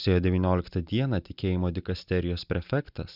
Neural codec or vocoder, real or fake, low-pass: none; real; 5.4 kHz